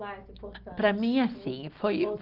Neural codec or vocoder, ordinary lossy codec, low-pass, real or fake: none; Opus, 16 kbps; 5.4 kHz; real